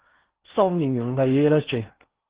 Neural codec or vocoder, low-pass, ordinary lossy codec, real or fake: codec, 16 kHz in and 24 kHz out, 0.6 kbps, FocalCodec, streaming, 4096 codes; 3.6 kHz; Opus, 16 kbps; fake